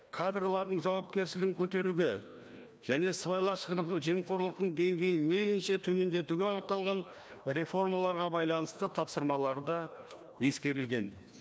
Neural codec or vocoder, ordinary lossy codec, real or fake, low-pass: codec, 16 kHz, 1 kbps, FreqCodec, larger model; none; fake; none